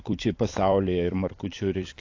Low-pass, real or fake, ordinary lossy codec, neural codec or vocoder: 7.2 kHz; fake; AAC, 48 kbps; vocoder, 44.1 kHz, 128 mel bands every 256 samples, BigVGAN v2